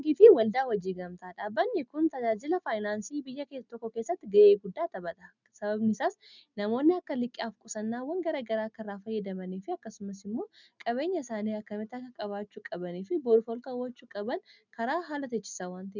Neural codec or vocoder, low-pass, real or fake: none; 7.2 kHz; real